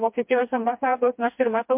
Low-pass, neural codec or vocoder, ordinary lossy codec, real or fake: 3.6 kHz; codec, 16 kHz, 2 kbps, FreqCodec, smaller model; MP3, 32 kbps; fake